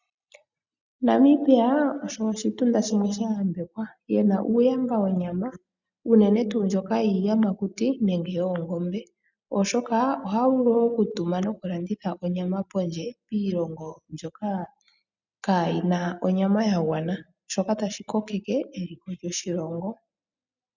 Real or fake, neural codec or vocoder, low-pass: real; none; 7.2 kHz